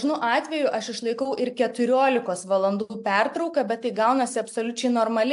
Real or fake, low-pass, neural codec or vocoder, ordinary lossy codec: real; 10.8 kHz; none; AAC, 64 kbps